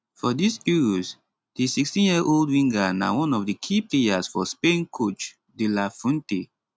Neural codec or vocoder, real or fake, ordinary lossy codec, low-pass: none; real; none; none